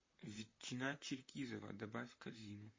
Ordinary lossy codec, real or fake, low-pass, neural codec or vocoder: MP3, 32 kbps; fake; 7.2 kHz; vocoder, 44.1 kHz, 128 mel bands, Pupu-Vocoder